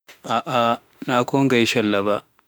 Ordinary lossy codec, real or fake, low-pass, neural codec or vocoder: none; fake; none; autoencoder, 48 kHz, 32 numbers a frame, DAC-VAE, trained on Japanese speech